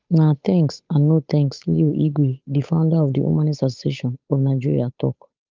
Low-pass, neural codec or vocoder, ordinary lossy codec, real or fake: 7.2 kHz; codec, 16 kHz, 8 kbps, FunCodec, trained on Chinese and English, 25 frames a second; Opus, 24 kbps; fake